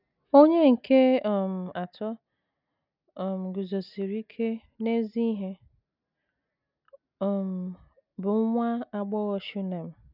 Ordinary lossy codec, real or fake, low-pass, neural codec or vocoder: none; real; 5.4 kHz; none